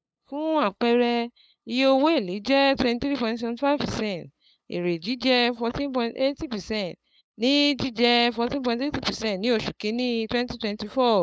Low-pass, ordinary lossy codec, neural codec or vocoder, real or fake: none; none; codec, 16 kHz, 8 kbps, FunCodec, trained on LibriTTS, 25 frames a second; fake